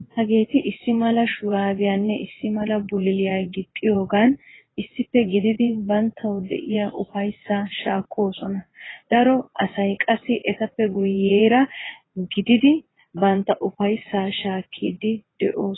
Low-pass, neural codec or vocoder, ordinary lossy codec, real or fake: 7.2 kHz; vocoder, 22.05 kHz, 80 mel bands, WaveNeXt; AAC, 16 kbps; fake